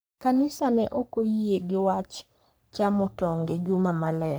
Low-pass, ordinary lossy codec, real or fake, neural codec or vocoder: none; none; fake; codec, 44.1 kHz, 3.4 kbps, Pupu-Codec